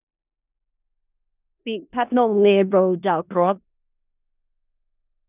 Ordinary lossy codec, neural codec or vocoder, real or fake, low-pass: none; codec, 16 kHz in and 24 kHz out, 0.4 kbps, LongCat-Audio-Codec, four codebook decoder; fake; 3.6 kHz